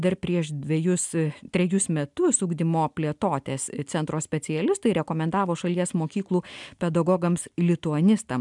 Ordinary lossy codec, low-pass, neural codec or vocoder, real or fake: MP3, 96 kbps; 10.8 kHz; none; real